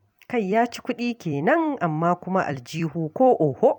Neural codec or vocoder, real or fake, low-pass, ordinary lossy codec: vocoder, 44.1 kHz, 128 mel bands every 256 samples, BigVGAN v2; fake; 19.8 kHz; none